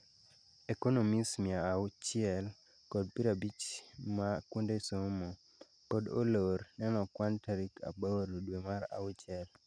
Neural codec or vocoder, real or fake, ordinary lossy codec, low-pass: none; real; none; 9.9 kHz